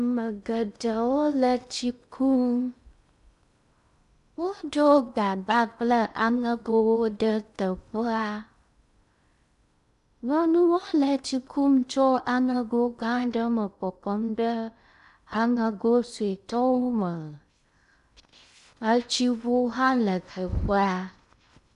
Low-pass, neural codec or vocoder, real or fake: 10.8 kHz; codec, 16 kHz in and 24 kHz out, 0.6 kbps, FocalCodec, streaming, 2048 codes; fake